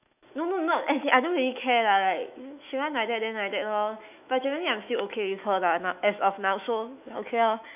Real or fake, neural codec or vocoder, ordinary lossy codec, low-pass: fake; autoencoder, 48 kHz, 128 numbers a frame, DAC-VAE, trained on Japanese speech; none; 3.6 kHz